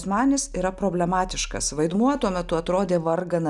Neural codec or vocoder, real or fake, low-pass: none; real; 10.8 kHz